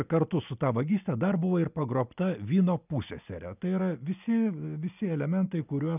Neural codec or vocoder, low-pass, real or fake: none; 3.6 kHz; real